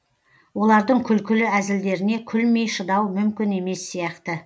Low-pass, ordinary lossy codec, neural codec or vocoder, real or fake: none; none; none; real